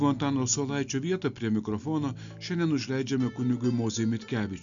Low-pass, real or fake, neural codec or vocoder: 7.2 kHz; real; none